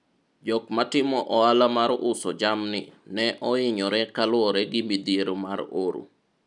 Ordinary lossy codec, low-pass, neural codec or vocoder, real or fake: none; 10.8 kHz; none; real